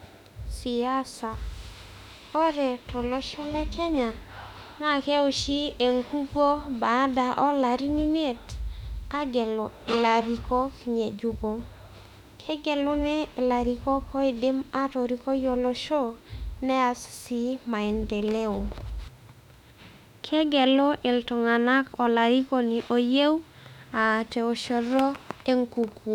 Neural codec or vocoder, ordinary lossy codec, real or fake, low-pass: autoencoder, 48 kHz, 32 numbers a frame, DAC-VAE, trained on Japanese speech; none; fake; 19.8 kHz